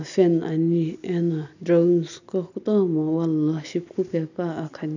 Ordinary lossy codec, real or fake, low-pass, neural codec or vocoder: none; real; 7.2 kHz; none